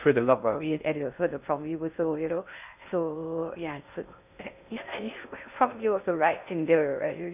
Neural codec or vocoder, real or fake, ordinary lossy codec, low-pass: codec, 16 kHz in and 24 kHz out, 0.6 kbps, FocalCodec, streaming, 2048 codes; fake; none; 3.6 kHz